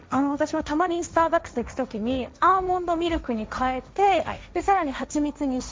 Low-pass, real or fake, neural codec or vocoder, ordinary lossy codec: none; fake; codec, 16 kHz, 1.1 kbps, Voila-Tokenizer; none